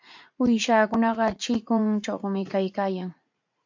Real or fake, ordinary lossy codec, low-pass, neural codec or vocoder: fake; MP3, 48 kbps; 7.2 kHz; vocoder, 44.1 kHz, 80 mel bands, Vocos